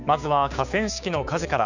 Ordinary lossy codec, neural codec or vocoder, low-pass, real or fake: none; codec, 16 kHz, 6 kbps, DAC; 7.2 kHz; fake